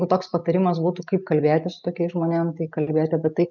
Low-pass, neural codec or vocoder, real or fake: 7.2 kHz; none; real